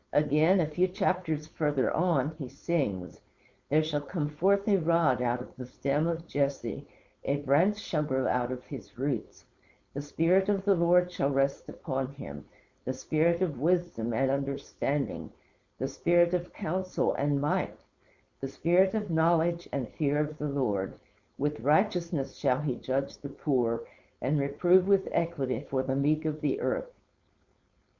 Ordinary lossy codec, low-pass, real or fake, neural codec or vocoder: Opus, 64 kbps; 7.2 kHz; fake; codec, 16 kHz, 4.8 kbps, FACodec